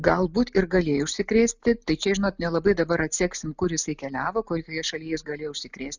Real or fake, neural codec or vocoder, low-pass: real; none; 7.2 kHz